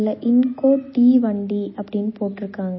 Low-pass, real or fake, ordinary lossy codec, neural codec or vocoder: 7.2 kHz; real; MP3, 24 kbps; none